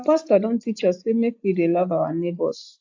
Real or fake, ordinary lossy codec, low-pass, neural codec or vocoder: real; AAC, 48 kbps; 7.2 kHz; none